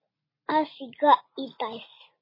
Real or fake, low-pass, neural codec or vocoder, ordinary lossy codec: real; 5.4 kHz; none; MP3, 24 kbps